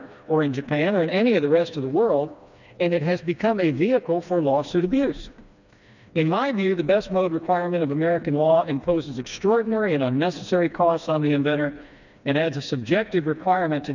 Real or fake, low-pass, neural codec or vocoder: fake; 7.2 kHz; codec, 16 kHz, 2 kbps, FreqCodec, smaller model